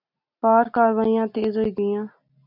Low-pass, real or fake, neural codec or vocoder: 5.4 kHz; real; none